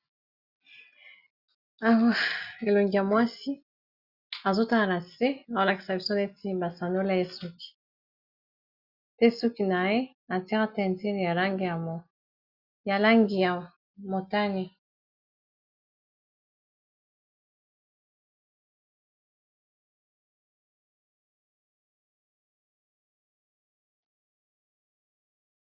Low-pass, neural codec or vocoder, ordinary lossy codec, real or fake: 5.4 kHz; none; Opus, 64 kbps; real